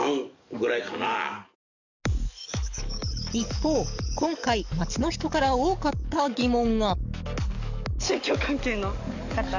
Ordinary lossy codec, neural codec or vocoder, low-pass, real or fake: none; codec, 44.1 kHz, 7.8 kbps, DAC; 7.2 kHz; fake